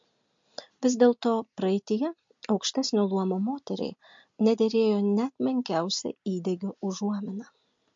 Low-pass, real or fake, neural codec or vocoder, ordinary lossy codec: 7.2 kHz; real; none; MP3, 48 kbps